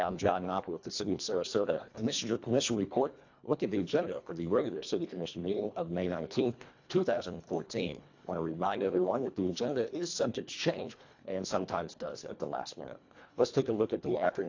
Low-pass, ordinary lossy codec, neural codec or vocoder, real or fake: 7.2 kHz; AAC, 48 kbps; codec, 24 kHz, 1.5 kbps, HILCodec; fake